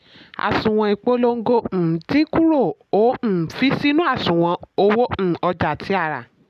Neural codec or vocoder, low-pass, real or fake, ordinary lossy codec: none; 14.4 kHz; real; none